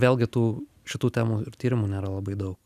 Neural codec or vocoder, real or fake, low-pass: none; real; 14.4 kHz